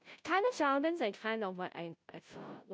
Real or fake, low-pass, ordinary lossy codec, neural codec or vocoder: fake; none; none; codec, 16 kHz, 0.5 kbps, FunCodec, trained on Chinese and English, 25 frames a second